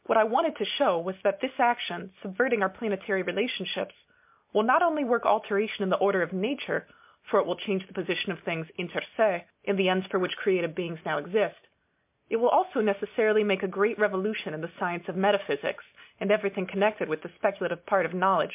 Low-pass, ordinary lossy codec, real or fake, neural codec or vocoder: 3.6 kHz; MP3, 32 kbps; real; none